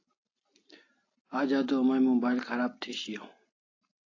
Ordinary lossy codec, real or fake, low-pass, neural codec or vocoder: AAC, 32 kbps; real; 7.2 kHz; none